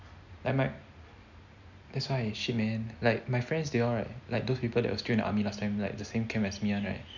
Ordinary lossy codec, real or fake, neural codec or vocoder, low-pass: none; real; none; 7.2 kHz